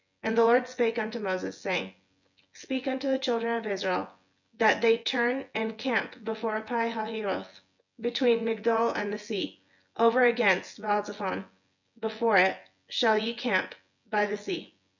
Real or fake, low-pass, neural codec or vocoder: fake; 7.2 kHz; vocoder, 24 kHz, 100 mel bands, Vocos